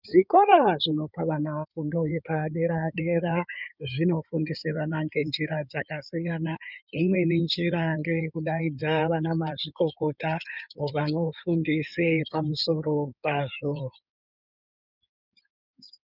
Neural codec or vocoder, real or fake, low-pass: codec, 16 kHz in and 24 kHz out, 2.2 kbps, FireRedTTS-2 codec; fake; 5.4 kHz